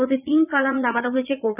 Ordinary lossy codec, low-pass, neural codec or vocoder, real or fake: none; 3.6 kHz; vocoder, 22.05 kHz, 80 mel bands, Vocos; fake